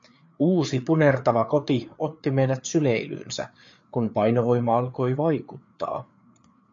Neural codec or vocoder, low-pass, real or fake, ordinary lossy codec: codec, 16 kHz, 4 kbps, FreqCodec, larger model; 7.2 kHz; fake; MP3, 48 kbps